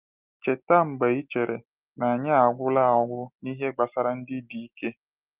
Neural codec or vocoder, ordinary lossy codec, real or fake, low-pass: none; Opus, 32 kbps; real; 3.6 kHz